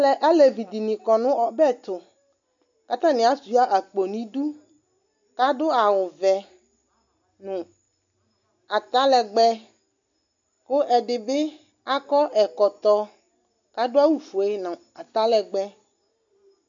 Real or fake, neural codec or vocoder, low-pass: real; none; 7.2 kHz